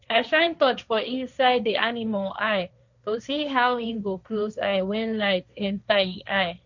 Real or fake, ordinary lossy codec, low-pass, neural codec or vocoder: fake; none; 7.2 kHz; codec, 16 kHz, 1.1 kbps, Voila-Tokenizer